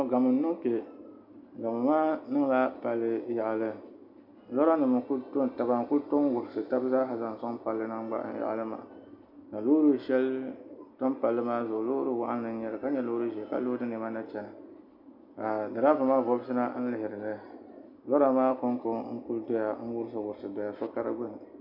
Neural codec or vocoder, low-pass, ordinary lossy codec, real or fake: none; 5.4 kHz; MP3, 48 kbps; real